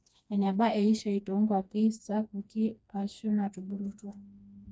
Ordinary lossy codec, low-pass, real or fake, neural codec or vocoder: none; none; fake; codec, 16 kHz, 2 kbps, FreqCodec, smaller model